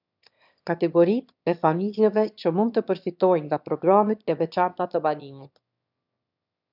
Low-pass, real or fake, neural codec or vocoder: 5.4 kHz; fake; autoencoder, 22.05 kHz, a latent of 192 numbers a frame, VITS, trained on one speaker